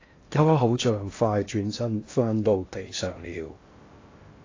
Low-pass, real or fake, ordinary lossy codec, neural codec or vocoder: 7.2 kHz; fake; AAC, 32 kbps; codec, 16 kHz in and 24 kHz out, 0.6 kbps, FocalCodec, streaming, 4096 codes